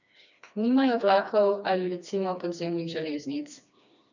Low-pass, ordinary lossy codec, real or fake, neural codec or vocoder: 7.2 kHz; none; fake; codec, 16 kHz, 2 kbps, FreqCodec, smaller model